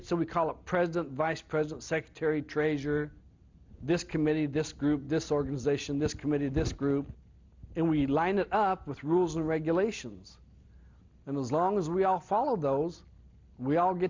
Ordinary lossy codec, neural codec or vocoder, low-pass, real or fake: MP3, 64 kbps; none; 7.2 kHz; real